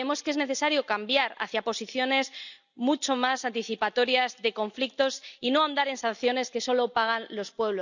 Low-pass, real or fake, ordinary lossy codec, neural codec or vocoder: 7.2 kHz; real; none; none